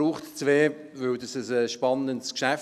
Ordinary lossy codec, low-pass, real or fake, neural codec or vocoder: none; 14.4 kHz; real; none